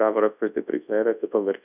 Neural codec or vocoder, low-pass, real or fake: codec, 24 kHz, 0.9 kbps, WavTokenizer, large speech release; 3.6 kHz; fake